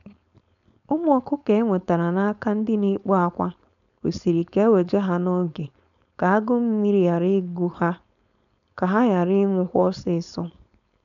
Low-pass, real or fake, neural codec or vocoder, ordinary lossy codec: 7.2 kHz; fake; codec, 16 kHz, 4.8 kbps, FACodec; none